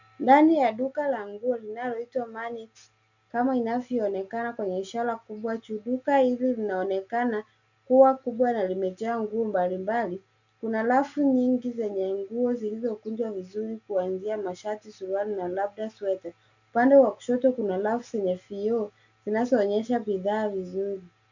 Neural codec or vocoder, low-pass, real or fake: none; 7.2 kHz; real